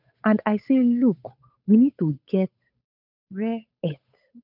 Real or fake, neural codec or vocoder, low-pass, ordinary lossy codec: fake; codec, 16 kHz, 8 kbps, FunCodec, trained on Chinese and English, 25 frames a second; 5.4 kHz; none